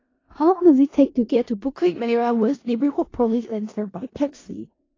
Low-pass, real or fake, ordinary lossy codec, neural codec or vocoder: 7.2 kHz; fake; AAC, 32 kbps; codec, 16 kHz in and 24 kHz out, 0.4 kbps, LongCat-Audio-Codec, four codebook decoder